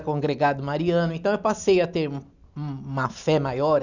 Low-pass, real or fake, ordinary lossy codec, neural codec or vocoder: 7.2 kHz; real; none; none